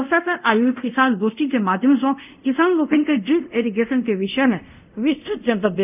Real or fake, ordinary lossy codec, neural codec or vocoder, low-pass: fake; none; codec, 24 kHz, 0.5 kbps, DualCodec; 3.6 kHz